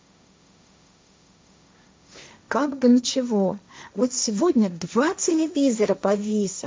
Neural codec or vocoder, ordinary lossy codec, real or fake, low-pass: codec, 16 kHz, 1.1 kbps, Voila-Tokenizer; none; fake; none